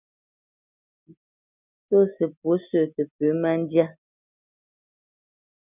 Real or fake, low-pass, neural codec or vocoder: real; 3.6 kHz; none